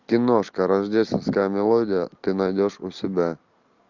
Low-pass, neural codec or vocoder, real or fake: 7.2 kHz; none; real